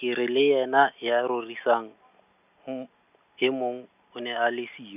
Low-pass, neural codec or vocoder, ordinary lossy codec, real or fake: 3.6 kHz; none; none; real